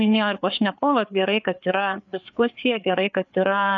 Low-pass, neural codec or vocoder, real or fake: 7.2 kHz; codec, 16 kHz, 4 kbps, FreqCodec, larger model; fake